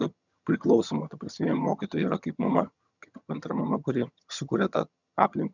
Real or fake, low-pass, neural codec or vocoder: fake; 7.2 kHz; vocoder, 22.05 kHz, 80 mel bands, HiFi-GAN